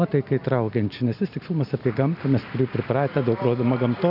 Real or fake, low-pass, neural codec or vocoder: real; 5.4 kHz; none